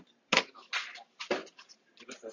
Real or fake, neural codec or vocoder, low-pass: real; none; 7.2 kHz